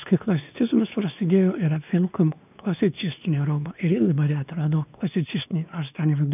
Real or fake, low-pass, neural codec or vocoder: fake; 3.6 kHz; codec, 16 kHz, 2 kbps, X-Codec, WavLM features, trained on Multilingual LibriSpeech